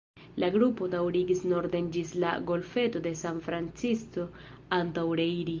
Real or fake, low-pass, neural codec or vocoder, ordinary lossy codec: real; 7.2 kHz; none; Opus, 32 kbps